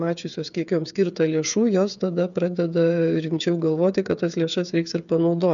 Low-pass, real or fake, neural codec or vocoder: 7.2 kHz; fake; codec, 16 kHz, 8 kbps, FreqCodec, smaller model